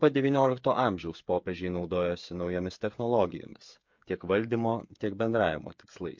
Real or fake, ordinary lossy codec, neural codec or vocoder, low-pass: fake; MP3, 48 kbps; codec, 16 kHz, 8 kbps, FreqCodec, smaller model; 7.2 kHz